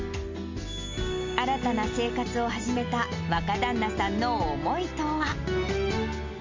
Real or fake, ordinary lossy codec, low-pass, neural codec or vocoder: real; MP3, 64 kbps; 7.2 kHz; none